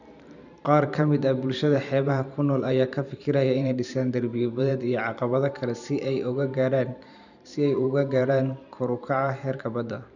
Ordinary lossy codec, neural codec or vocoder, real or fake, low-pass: none; vocoder, 44.1 kHz, 128 mel bands every 512 samples, BigVGAN v2; fake; 7.2 kHz